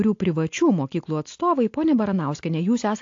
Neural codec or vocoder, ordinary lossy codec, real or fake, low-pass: none; AAC, 48 kbps; real; 7.2 kHz